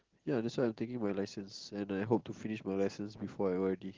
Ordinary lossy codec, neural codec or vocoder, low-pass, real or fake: Opus, 16 kbps; none; 7.2 kHz; real